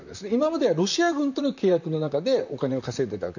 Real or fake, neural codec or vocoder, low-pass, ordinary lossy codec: fake; vocoder, 44.1 kHz, 128 mel bands, Pupu-Vocoder; 7.2 kHz; none